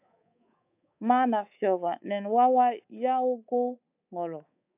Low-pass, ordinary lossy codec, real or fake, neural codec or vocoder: 3.6 kHz; AAC, 32 kbps; fake; codec, 24 kHz, 3.1 kbps, DualCodec